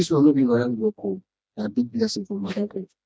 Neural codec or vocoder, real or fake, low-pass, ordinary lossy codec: codec, 16 kHz, 1 kbps, FreqCodec, smaller model; fake; none; none